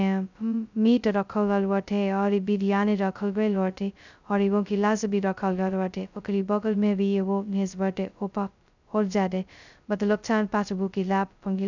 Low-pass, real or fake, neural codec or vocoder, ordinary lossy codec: 7.2 kHz; fake; codec, 16 kHz, 0.2 kbps, FocalCodec; none